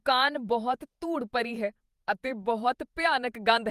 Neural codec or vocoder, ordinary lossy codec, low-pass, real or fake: vocoder, 48 kHz, 128 mel bands, Vocos; Opus, 32 kbps; 14.4 kHz; fake